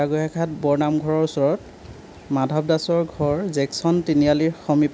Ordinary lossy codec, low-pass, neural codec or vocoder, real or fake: none; none; none; real